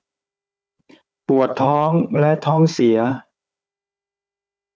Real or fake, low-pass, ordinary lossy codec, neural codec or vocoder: fake; none; none; codec, 16 kHz, 4 kbps, FunCodec, trained on Chinese and English, 50 frames a second